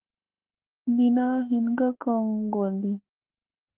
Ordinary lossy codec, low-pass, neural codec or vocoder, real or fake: Opus, 16 kbps; 3.6 kHz; autoencoder, 48 kHz, 32 numbers a frame, DAC-VAE, trained on Japanese speech; fake